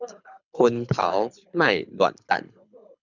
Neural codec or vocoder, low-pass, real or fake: codec, 24 kHz, 6 kbps, HILCodec; 7.2 kHz; fake